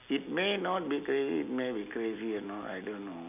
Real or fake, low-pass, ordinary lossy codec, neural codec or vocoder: real; 3.6 kHz; none; none